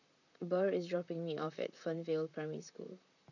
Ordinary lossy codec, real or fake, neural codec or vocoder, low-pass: none; fake; vocoder, 44.1 kHz, 128 mel bands, Pupu-Vocoder; 7.2 kHz